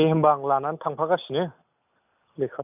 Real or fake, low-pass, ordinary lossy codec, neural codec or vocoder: real; 3.6 kHz; none; none